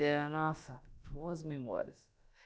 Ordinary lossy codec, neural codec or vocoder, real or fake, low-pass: none; codec, 16 kHz, about 1 kbps, DyCAST, with the encoder's durations; fake; none